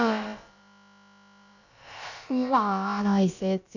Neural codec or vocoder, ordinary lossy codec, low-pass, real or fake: codec, 16 kHz, about 1 kbps, DyCAST, with the encoder's durations; none; 7.2 kHz; fake